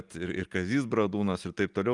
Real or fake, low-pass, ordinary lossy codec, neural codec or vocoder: real; 10.8 kHz; Opus, 32 kbps; none